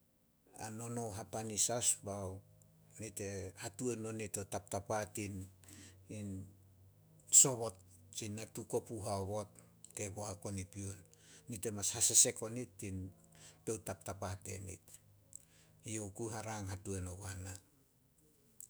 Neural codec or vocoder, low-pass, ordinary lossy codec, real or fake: autoencoder, 48 kHz, 128 numbers a frame, DAC-VAE, trained on Japanese speech; none; none; fake